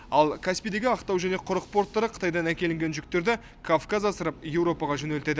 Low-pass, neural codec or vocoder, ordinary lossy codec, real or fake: none; none; none; real